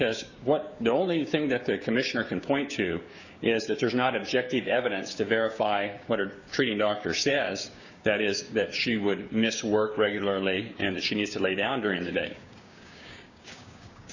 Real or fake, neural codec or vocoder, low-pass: fake; codec, 16 kHz, 6 kbps, DAC; 7.2 kHz